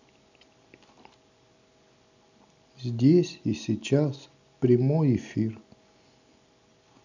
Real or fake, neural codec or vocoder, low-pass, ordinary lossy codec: real; none; 7.2 kHz; none